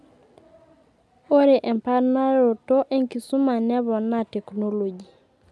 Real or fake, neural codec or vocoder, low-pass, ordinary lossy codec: real; none; none; none